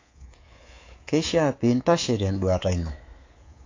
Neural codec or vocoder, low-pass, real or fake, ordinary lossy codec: codec, 24 kHz, 3.1 kbps, DualCodec; 7.2 kHz; fake; AAC, 32 kbps